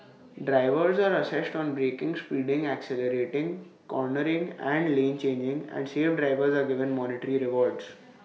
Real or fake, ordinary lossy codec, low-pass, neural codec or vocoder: real; none; none; none